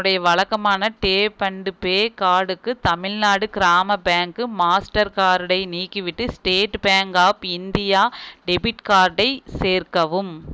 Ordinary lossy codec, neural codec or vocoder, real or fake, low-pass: none; none; real; none